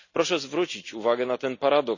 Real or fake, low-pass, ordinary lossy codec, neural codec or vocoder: real; 7.2 kHz; none; none